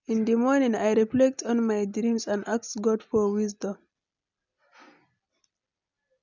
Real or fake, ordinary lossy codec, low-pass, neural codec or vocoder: real; none; 7.2 kHz; none